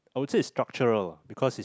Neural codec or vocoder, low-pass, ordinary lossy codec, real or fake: none; none; none; real